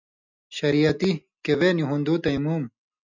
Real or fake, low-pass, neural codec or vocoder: real; 7.2 kHz; none